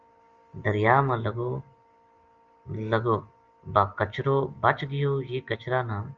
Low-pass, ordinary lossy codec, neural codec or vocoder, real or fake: 7.2 kHz; Opus, 32 kbps; none; real